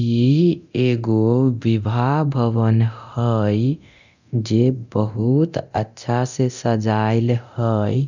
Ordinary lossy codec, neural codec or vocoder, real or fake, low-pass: none; codec, 24 kHz, 0.9 kbps, DualCodec; fake; 7.2 kHz